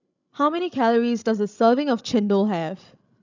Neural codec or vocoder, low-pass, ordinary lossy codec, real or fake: codec, 16 kHz, 16 kbps, FreqCodec, larger model; 7.2 kHz; none; fake